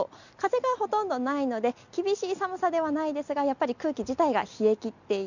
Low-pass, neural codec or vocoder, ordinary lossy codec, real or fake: 7.2 kHz; none; none; real